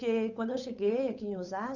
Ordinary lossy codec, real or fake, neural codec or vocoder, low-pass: none; fake; codec, 16 kHz, 8 kbps, FunCodec, trained on Chinese and English, 25 frames a second; 7.2 kHz